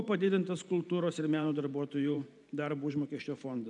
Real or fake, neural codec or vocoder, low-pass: fake; vocoder, 44.1 kHz, 128 mel bands every 512 samples, BigVGAN v2; 10.8 kHz